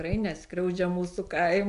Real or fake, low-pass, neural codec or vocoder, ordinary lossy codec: real; 10.8 kHz; none; MP3, 64 kbps